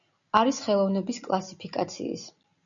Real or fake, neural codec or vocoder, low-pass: real; none; 7.2 kHz